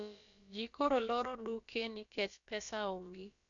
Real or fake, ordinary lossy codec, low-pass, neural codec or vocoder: fake; none; 7.2 kHz; codec, 16 kHz, about 1 kbps, DyCAST, with the encoder's durations